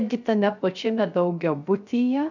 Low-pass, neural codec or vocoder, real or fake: 7.2 kHz; codec, 16 kHz, 0.3 kbps, FocalCodec; fake